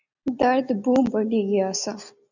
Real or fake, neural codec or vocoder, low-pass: real; none; 7.2 kHz